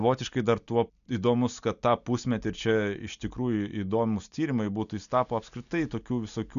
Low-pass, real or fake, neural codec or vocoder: 7.2 kHz; real; none